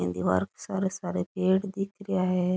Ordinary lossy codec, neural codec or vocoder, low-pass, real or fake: none; none; none; real